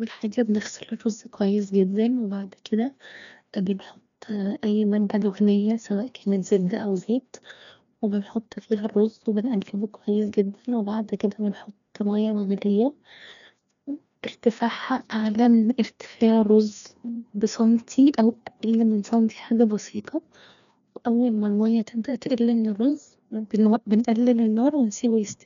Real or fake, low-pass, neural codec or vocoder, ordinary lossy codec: fake; 7.2 kHz; codec, 16 kHz, 1 kbps, FreqCodec, larger model; none